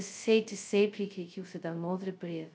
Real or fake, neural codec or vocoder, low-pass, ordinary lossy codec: fake; codec, 16 kHz, 0.2 kbps, FocalCodec; none; none